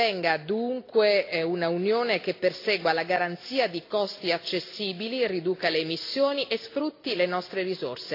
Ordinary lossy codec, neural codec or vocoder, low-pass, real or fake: AAC, 32 kbps; none; 5.4 kHz; real